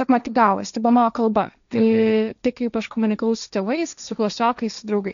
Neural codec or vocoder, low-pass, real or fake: codec, 16 kHz, 1.1 kbps, Voila-Tokenizer; 7.2 kHz; fake